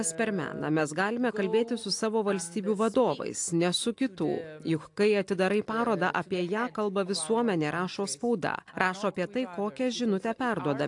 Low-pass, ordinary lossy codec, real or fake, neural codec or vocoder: 10.8 kHz; AAC, 64 kbps; real; none